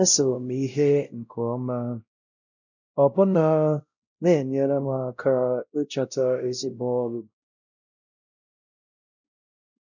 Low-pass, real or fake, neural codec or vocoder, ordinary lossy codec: 7.2 kHz; fake; codec, 16 kHz, 0.5 kbps, X-Codec, WavLM features, trained on Multilingual LibriSpeech; none